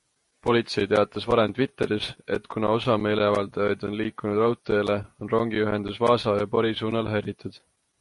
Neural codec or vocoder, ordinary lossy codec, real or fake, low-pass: vocoder, 48 kHz, 128 mel bands, Vocos; MP3, 48 kbps; fake; 14.4 kHz